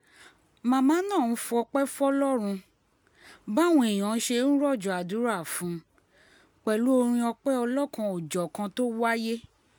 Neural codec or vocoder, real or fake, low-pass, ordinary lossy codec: none; real; none; none